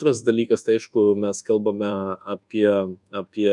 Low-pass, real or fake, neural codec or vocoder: 10.8 kHz; fake; codec, 24 kHz, 1.2 kbps, DualCodec